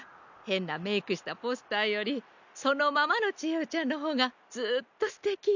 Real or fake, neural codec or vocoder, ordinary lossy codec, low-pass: real; none; none; 7.2 kHz